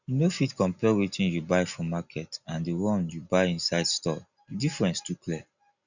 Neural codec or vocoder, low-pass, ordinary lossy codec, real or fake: none; 7.2 kHz; none; real